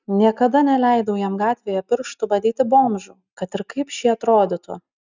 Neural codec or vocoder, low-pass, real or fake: none; 7.2 kHz; real